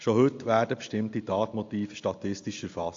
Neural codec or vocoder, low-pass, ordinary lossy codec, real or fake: none; 7.2 kHz; AAC, 48 kbps; real